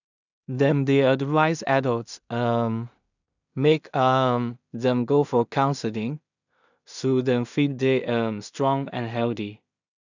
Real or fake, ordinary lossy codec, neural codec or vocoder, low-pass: fake; none; codec, 16 kHz in and 24 kHz out, 0.4 kbps, LongCat-Audio-Codec, two codebook decoder; 7.2 kHz